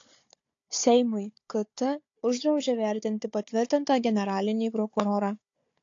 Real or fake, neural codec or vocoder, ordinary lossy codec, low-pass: fake; codec, 16 kHz, 4 kbps, FunCodec, trained on Chinese and English, 50 frames a second; AAC, 48 kbps; 7.2 kHz